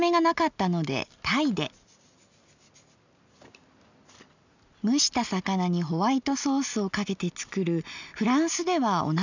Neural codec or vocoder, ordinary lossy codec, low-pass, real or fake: none; none; 7.2 kHz; real